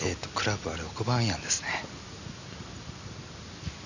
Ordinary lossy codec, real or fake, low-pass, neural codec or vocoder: none; real; 7.2 kHz; none